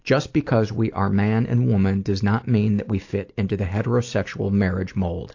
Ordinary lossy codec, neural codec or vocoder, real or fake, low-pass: AAC, 48 kbps; none; real; 7.2 kHz